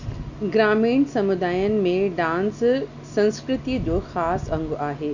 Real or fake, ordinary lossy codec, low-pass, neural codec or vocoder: real; none; 7.2 kHz; none